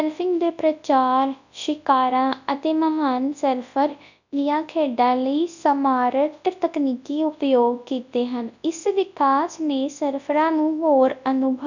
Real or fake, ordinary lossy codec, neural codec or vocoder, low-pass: fake; none; codec, 24 kHz, 0.9 kbps, WavTokenizer, large speech release; 7.2 kHz